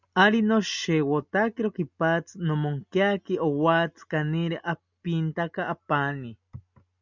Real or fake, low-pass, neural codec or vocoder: real; 7.2 kHz; none